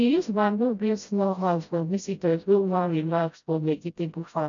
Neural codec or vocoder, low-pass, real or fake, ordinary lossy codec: codec, 16 kHz, 0.5 kbps, FreqCodec, smaller model; 7.2 kHz; fake; none